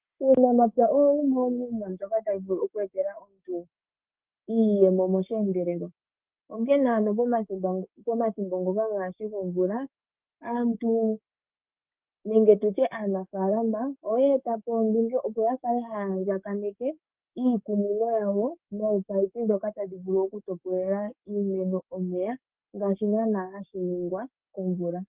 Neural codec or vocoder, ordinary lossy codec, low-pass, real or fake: codec, 44.1 kHz, 7.8 kbps, Pupu-Codec; Opus, 24 kbps; 3.6 kHz; fake